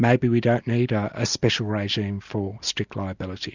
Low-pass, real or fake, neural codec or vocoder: 7.2 kHz; real; none